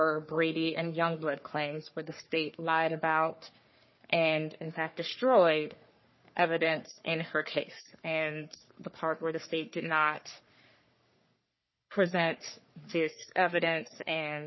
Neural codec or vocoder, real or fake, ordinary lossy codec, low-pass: codec, 44.1 kHz, 3.4 kbps, Pupu-Codec; fake; MP3, 24 kbps; 7.2 kHz